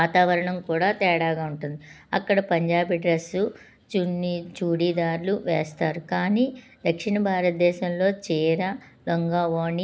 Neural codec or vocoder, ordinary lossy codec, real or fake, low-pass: none; none; real; none